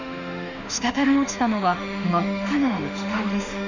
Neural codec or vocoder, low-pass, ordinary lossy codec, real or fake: autoencoder, 48 kHz, 32 numbers a frame, DAC-VAE, trained on Japanese speech; 7.2 kHz; none; fake